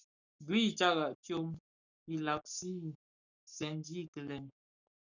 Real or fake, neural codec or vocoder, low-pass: fake; codec, 44.1 kHz, 7.8 kbps, Pupu-Codec; 7.2 kHz